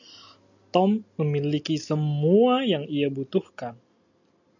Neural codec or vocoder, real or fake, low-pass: none; real; 7.2 kHz